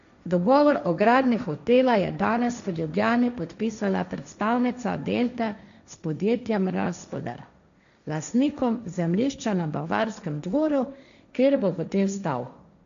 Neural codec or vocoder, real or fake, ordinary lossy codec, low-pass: codec, 16 kHz, 1.1 kbps, Voila-Tokenizer; fake; none; 7.2 kHz